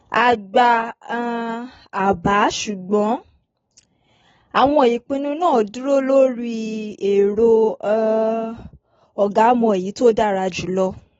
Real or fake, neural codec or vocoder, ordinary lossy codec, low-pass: real; none; AAC, 24 kbps; 7.2 kHz